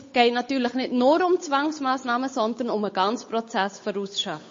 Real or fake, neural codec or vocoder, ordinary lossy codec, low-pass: fake; codec, 16 kHz, 8 kbps, FunCodec, trained on Chinese and English, 25 frames a second; MP3, 32 kbps; 7.2 kHz